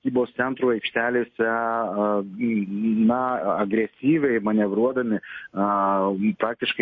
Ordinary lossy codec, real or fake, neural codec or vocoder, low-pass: MP3, 32 kbps; real; none; 7.2 kHz